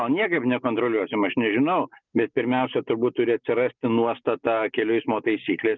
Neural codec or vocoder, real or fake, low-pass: none; real; 7.2 kHz